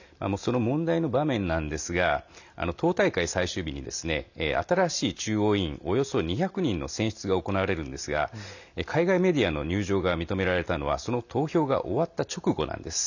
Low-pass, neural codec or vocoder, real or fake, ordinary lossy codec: 7.2 kHz; none; real; none